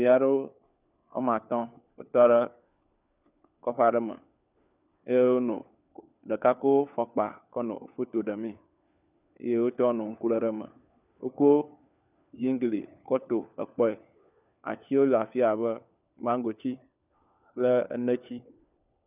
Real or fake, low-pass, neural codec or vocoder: fake; 3.6 kHz; codec, 16 kHz, 4 kbps, FunCodec, trained on LibriTTS, 50 frames a second